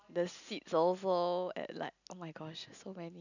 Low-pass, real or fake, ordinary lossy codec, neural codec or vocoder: 7.2 kHz; real; none; none